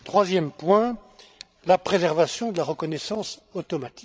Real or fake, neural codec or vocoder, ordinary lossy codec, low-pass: fake; codec, 16 kHz, 16 kbps, FreqCodec, larger model; none; none